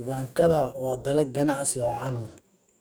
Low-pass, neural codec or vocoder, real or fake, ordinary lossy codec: none; codec, 44.1 kHz, 2.6 kbps, DAC; fake; none